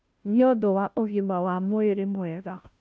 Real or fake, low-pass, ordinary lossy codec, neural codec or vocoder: fake; none; none; codec, 16 kHz, 0.5 kbps, FunCodec, trained on Chinese and English, 25 frames a second